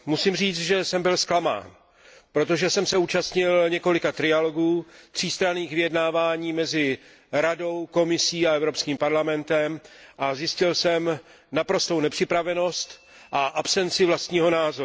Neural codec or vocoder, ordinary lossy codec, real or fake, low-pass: none; none; real; none